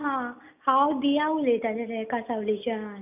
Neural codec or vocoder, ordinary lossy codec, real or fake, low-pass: none; none; real; 3.6 kHz